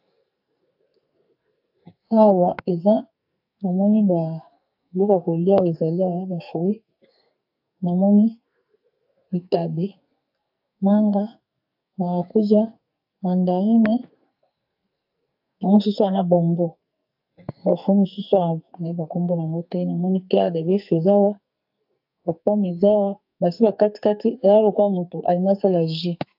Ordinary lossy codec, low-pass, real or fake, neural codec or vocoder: AAC, 48 kbps; 5.4 kHz; fake; codec, 44.1 kHz, 2.6 kbps, SNAC